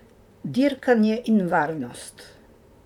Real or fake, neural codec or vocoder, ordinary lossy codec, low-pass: fake; vocoder, 44.1 kHz, 128 mel bands, Pupu-Vocoder; none; 19.8 kHz